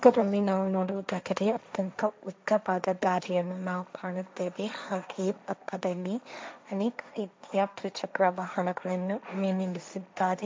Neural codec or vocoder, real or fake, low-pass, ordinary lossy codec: codec, 16 kHz, 1.1 kbps, Voila-Tokenizer; fake; none; none